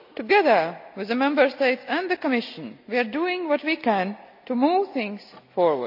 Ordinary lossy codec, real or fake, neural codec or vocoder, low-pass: AAC, 48 kbps; real; none; 5.4 kHz